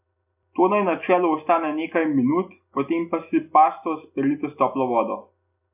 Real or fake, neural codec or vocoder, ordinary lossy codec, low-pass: real; none; AAC, 32 kbps; 3.6 kHz